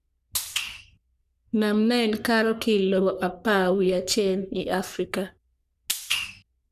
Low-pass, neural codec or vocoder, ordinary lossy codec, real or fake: 14.4 kHz; codec, 44.1 kHz, 3.4 kbps, Pupu-Codec; none; fake